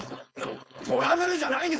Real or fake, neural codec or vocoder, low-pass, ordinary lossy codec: fake; codec, 16 kHz, 4.8 kbps, FACodec; none; none